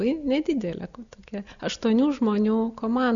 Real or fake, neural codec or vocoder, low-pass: real; none; 7.2 kHz